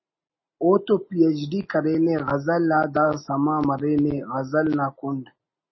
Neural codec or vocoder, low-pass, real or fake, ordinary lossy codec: none; 7.2 kHz; real; MP3, 24 kbps